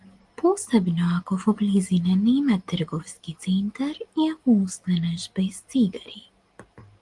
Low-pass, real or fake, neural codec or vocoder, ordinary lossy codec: 10.8 kHz; real; none; Opus, 24 kbps